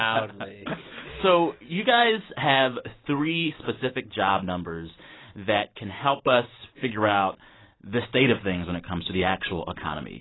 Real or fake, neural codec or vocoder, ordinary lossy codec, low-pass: real; none; AAC, 16 kbps; 7.2 kHz